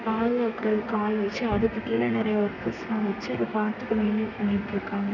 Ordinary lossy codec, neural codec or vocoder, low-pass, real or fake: none; codec, 32 kHz, 1.9 kbps, SNAC; 7.2 kHz; fake